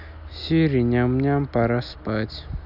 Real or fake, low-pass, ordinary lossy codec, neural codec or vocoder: real; 5.4 kHz; none; none